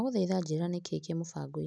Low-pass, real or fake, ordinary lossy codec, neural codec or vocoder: none; real; none; none